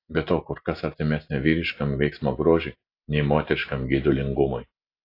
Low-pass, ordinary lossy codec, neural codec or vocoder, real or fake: 5.4 kHz; AAC, 32 kbps; none; real